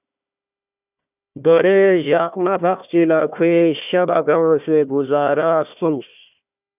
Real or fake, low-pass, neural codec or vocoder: fake; 3.6 kHz; codec, 16 kHz, 1 kbps, FunCodec, trained on Chinese and English, 50 frames a second